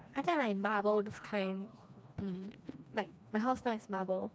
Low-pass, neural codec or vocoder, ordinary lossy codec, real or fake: none; codec, 16 kHz, 2 kbps, FreqCodec, smaller model; none; fake